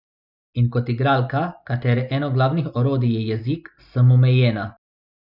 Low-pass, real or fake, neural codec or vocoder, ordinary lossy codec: 5.4 kHz; real; none; none